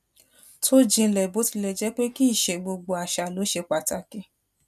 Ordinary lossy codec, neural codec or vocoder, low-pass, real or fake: none; none; 14.4 kHz; real